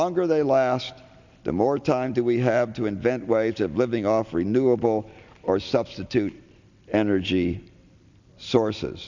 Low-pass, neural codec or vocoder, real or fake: 7.2 kHz; none; real